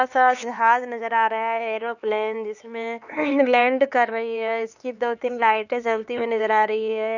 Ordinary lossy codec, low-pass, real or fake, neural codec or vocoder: none; 7.2 kHz; fake; codec, 16 kHz, 4 kbps, X-Codec, HuBERT features, trained on LibriSpeech